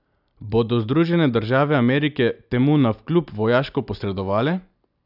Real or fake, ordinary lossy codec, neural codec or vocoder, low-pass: real; none; none; 5.4 kHz